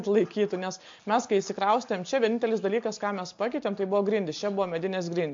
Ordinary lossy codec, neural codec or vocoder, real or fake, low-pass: MP3, 48 kbps; none; real; 7.2 kHz